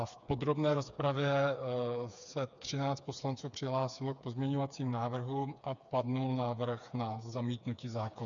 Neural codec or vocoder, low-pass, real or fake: codec, 16 kHz, 4 kbps, FreqCodec, smaller model; 7.2 kHz; fake